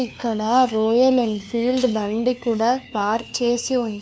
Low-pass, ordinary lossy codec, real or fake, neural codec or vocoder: none; none; fake; codec, 16 kHz, 2 kbps, FunCodec, trained on LibriTTS, 25 frames a second